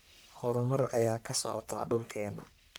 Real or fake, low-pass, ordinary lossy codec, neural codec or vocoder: fake; none; none; codec, 44.1 kHz, 1.7 kbps, Pupu-Codec